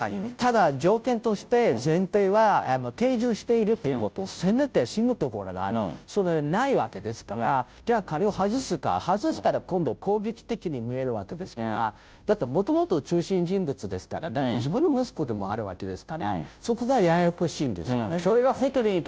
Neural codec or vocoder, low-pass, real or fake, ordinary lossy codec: codec, 16 kHz, 0.5 kbps, FunCodec, trained on Chinese and English, 25 frames a second; none; fake; none